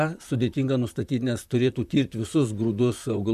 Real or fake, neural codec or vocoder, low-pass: fake; vocoder, 44.1 kHz, 128 mel bands, Pupu-Vocoder; 14.4 kHz